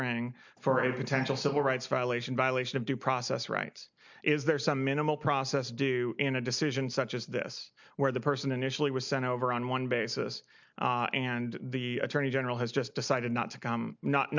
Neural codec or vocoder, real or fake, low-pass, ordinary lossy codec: none; real; 7.2 kHz; MP3, 64 kbps